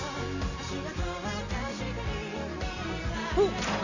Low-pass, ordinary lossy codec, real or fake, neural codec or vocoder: 7.2 kHz; none; real; none